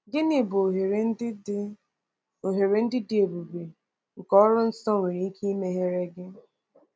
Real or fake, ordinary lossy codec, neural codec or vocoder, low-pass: real; none; none; none